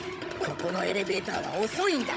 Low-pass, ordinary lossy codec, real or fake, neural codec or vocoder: none; none; fake; codec, 16 kHz, 16 kbps, FunCodec, trained on Chinese and English, 50 frames a second